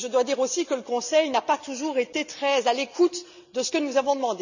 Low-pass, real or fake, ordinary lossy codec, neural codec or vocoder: 7.2 kHz; real; none; none